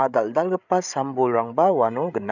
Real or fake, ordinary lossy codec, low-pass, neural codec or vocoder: fake; none; 7.2 kHz; codec, 16 kHz, 8 kbps, FreqCodec, larger model